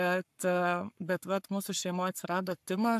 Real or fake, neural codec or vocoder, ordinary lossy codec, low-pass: fake; codec, 44.1 kHz, 7.8 kbps, Pupu-Codec; AAC, 96 kbps; 14.4 kHz